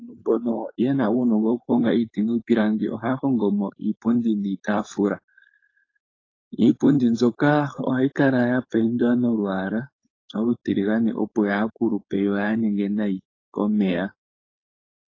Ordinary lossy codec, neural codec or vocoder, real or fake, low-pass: AAC, 32 kbps; codec, 16 kHz, 4.8 kbps, FACodec; fake; 7.2 kHz